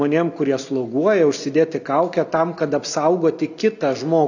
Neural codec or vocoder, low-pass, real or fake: none; 7.2 kHz; real